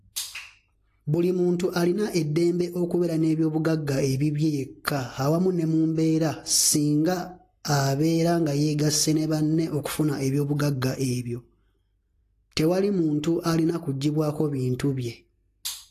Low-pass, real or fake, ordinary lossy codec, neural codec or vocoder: 14.4 kHz; real; AAC, 48 kbps; none